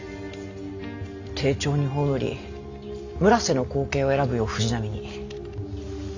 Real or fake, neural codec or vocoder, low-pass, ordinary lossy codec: real; none; 7.2 kHz; AAC, 48 kbps